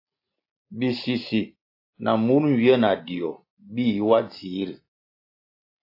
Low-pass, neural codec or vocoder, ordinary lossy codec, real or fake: 5.4 kHz; none; AAC, 32 kbps; real